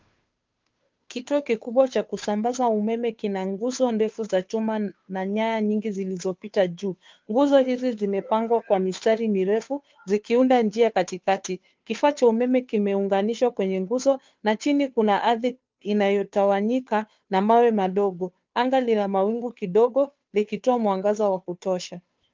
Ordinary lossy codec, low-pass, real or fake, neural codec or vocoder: Opus, 24 kbps; 7.2 kHz; fake; codec, 16 kHz, 2 kbps, FunCodec, trained on Chinese and English, 25 frames a second